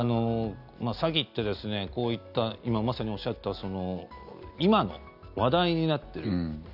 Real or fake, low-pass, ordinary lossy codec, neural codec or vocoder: real; 5.4 kHz; none; none